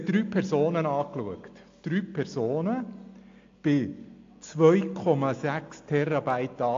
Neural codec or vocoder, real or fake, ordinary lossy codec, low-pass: none; real; AAC, 48 kbps; 7.2 kHz